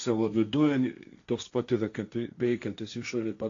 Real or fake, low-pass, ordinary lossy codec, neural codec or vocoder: fake; 7.2 kHz; AAC, 48 kbps; codec, 16 kHz, 1.1 kbps, Voila-Tokenizer